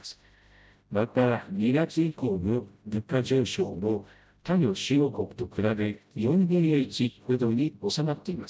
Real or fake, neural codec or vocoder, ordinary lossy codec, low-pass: fake; codec, 16 kHz, 0.5 kbps, FreqCodec, smaller model; none; none